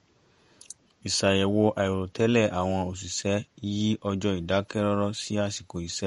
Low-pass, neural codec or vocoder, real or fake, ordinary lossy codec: 19.8 kHz; vocoder, 48 kHz, 128 mel bands, Vocos; fake; MP3, 48 kbps